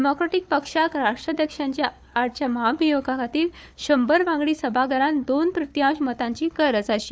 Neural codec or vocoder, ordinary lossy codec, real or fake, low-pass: codec, 16 kHz, 4 kbps, FunCodec, trained on Chinese and English, 50 frames a second; none; fake; none